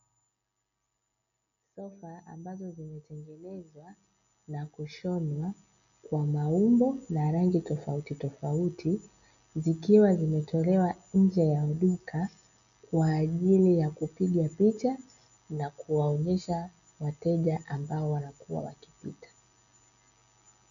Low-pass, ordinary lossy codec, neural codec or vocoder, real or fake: 7.2 kHz; MP3, 96 kbps; none; real